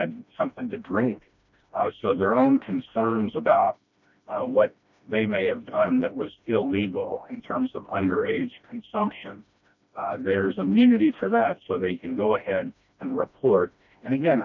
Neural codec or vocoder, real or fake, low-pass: codec, 16 kHz, 1 kbps, FreqCodec, smaller model; fake; 7.2 kHz